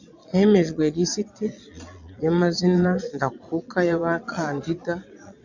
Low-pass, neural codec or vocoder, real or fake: 7.2 kHz; vocoder, 22.05 kHz, 80 mel bands, Vocos; fake